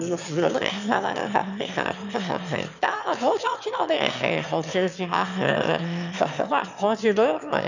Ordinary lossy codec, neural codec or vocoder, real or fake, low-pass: none; autoencoder, 22.05 kHz, a latent of 192 numbers a frame, VITS, trained on one speaker; fake; 7.2 kHz